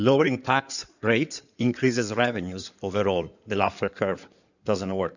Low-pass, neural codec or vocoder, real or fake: 7.2 kHz; codec, 16 kHz in and 24 kHz out, 2.2 kbps, FireRedTTS-2 codec; fake